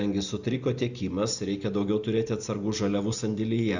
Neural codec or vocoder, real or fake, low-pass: none; real; 7.2 kHz